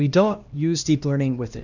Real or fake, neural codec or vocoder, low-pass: fake; codec, 16 kHz, 1 kbps, X-Codec, HuBERT features, trained on LibriSpeech; 7.2 kHz